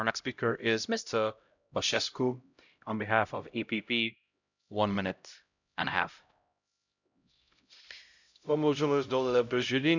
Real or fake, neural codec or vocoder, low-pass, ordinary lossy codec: fake; codec, 16 kHz, 0.5 kbps, X-Codec, HuBERT features, trained on LibriSpeech; 7.2 kHz; none